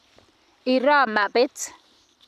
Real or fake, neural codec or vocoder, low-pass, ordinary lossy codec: real; none; 14.4 kHz; none